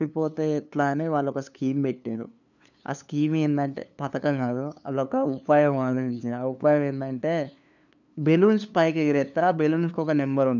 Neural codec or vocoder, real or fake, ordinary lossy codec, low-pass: codec, 16 kHz, 2 kbps, FunCodec, trained on LibriTTS, 25 frames a second; fake; none; 7.2 kHz